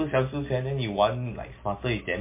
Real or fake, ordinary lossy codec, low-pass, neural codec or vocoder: real; MP3, 24 kbps; 3.6 kHz; none